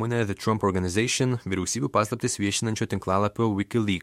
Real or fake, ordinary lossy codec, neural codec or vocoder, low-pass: fake; MP3, 64 kbps; autoencoder, 48 kHz, 128 numbers a frame, DAC-VAE, trained on Japanese speech; 19.8 kHz